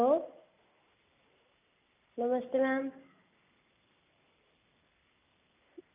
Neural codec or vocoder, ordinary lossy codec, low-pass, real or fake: none; none; 3.6 kHz; real